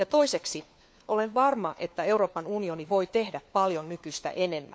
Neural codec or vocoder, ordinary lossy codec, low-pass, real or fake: codec, 16 kHz, 4 kbps, FunCodec, trained on LibriTTS, 50 frames a second; none; none; fake